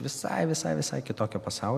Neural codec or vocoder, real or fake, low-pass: none; real; 14.4 kHz